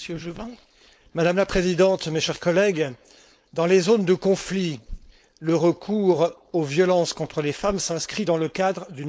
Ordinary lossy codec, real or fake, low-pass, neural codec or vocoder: none; fake; none; codec, 16 kHz, 4.8 kbps, FACodec